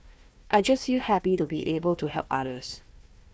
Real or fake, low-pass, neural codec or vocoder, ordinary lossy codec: fake; none; codec, 16 kHz, 1 kbps, FunCodec, trained on Chinese and English, 50 frames a second; none